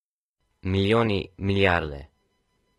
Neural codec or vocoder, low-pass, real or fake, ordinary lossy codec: none; 19.8 kHz; real; AAC, 32 kbps